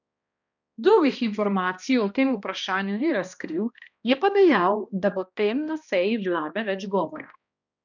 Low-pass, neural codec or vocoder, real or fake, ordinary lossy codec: 7.2 kHz; codec, 16 kHz, 1 kbps, X-Codec, HuBERT features, trained on balanced general audio; fake; none